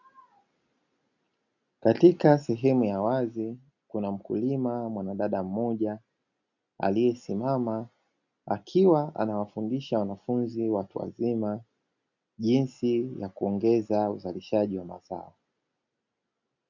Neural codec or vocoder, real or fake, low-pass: none; real; 7.2 kHz